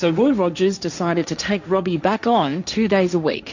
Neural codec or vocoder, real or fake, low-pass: codec, 16 kHz, 1.1 kbps, Voila-Tokenizer; fake; 7.2 kHz